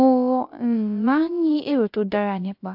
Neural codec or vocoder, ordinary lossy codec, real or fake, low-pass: codec, 16 kHz, 0.3 kbps, FocalCodec; AAC, 48 kbps; fake; 5.4 kHz